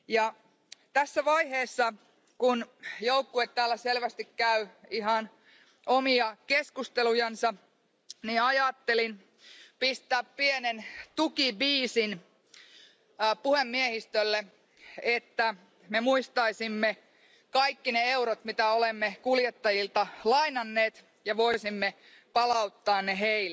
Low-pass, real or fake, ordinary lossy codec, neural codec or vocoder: none; real; none; none